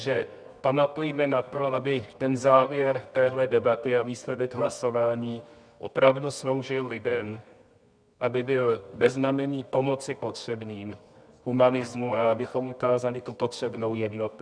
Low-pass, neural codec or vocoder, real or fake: 9.9 kHz; codec, 24 kHz, 0.9 kbps, WavTokenizer, medium music audio release; fake